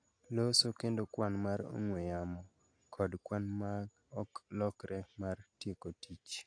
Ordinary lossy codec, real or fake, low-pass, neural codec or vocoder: AAC, 48 kbps; real; 9.9 kHz; none